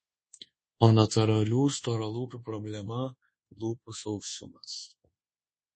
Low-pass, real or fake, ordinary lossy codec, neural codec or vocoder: 10.8 kHz; fake; MP3, 32 kbps; codec, 24 kHz, 1.2 kbps, DualCodec